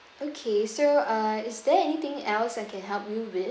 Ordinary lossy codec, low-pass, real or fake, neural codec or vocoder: none; none; real; none